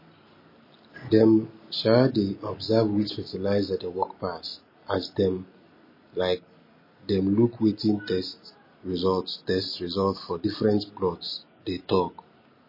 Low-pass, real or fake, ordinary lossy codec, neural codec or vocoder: 5.4 kHz; real; MP3, 24 kbps; none